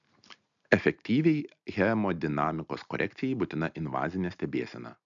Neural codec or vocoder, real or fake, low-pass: none; real; 7.2 kHz